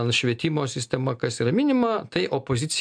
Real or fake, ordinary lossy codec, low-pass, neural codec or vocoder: real; MP3, 64 kbps; 9.9 kHz; none